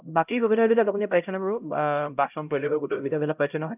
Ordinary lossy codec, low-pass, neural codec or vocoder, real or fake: none; 3.6 kHz; codec, 16 kHz, 0.5 kbps, X-Codec, HuBERT features, trained on LibriSpeech; fake